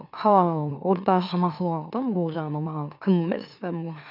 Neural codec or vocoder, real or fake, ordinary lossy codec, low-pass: autoencoder, 44.1 kHz, a latent of 192 numbers a frame, MeloTTS; fake; none; 5.4 kHz